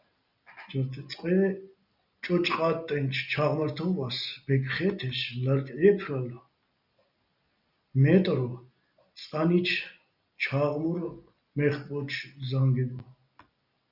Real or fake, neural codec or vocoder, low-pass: real; none; 5.4 kHz